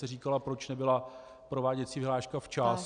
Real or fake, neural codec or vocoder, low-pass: real; none; 9.9 kHz